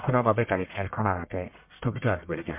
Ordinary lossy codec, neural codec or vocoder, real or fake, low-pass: MP3, 32 kbps; codec, 44.1 kHz, 1.7 kbps, Pupu-Codec; fake; 3.6 kHz